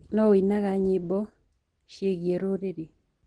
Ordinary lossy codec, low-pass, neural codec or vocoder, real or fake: Opus, 16 kbps; 9.9 kHz; none; real